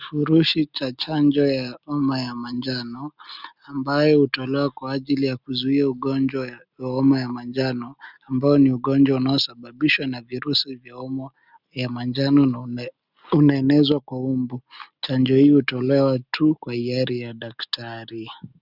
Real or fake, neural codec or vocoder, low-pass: real; none; 5.4 kHz